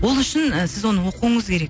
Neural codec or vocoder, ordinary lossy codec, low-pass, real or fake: none; none; none; real